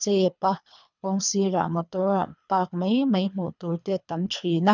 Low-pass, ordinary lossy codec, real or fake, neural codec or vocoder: 7.2 kHz; none; fake; codec, 24 kHz, 3 kbps, HILCodec